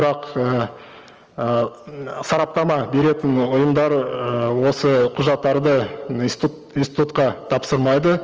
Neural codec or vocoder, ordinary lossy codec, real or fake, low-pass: none; Opus, 24 kbps; real; 7.2 kHz